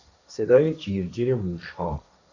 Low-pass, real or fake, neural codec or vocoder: 7.2 kHz; fake; codec, 16 kHz, 1.1 kbps, Voila-Tokenizer